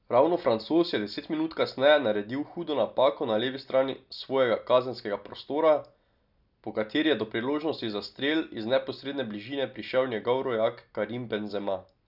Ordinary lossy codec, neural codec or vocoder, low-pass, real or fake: none; none; 5.4 kHz; real